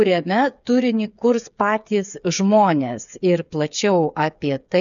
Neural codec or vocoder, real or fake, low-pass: codec, 16 kHz, 8 kbps, FreqCodec, smaller model; fake; 7.2 kHz